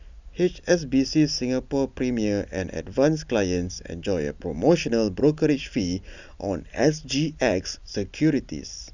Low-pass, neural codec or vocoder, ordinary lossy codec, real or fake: 7.2 kHz; none; none; real